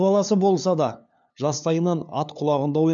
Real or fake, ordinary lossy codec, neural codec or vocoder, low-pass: fake; none; codec, 16 kHz, 2 kbps, FunCodec, trained on LibriTTS, 25 frames a second; 7.2 kHz